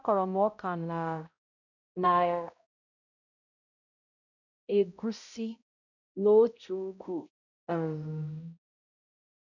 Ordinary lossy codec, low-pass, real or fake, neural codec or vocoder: none; 7.2 kHz; fake; codec, 16 kHz, 0.5 kbps, X-Codec, HuBERT features, trained on balanced general audio